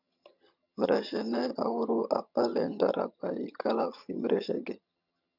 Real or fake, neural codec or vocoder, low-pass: fake; vocoder, 22.05 kHz, 80 mel bands, HiFi-GAN; 5.4 kHz